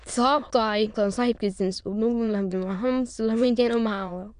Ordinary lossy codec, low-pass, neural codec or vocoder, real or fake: none; 9.9 kHz; autoencoder, 22.05 kHz, a latent of 192 numbers a frame, VITS, trained on many speakers; fake